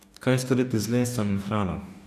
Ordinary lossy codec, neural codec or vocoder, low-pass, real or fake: none; codec, 44.1 kHz, 2.6 kbps, DAC; 14.4 kHz; fake